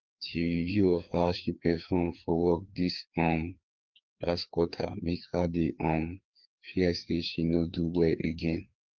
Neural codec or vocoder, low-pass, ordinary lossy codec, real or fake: codec, 16 kHz, 2 kbps, FreqCodec, larger model; 7.2 kHz; Opus, 32 kbps; fake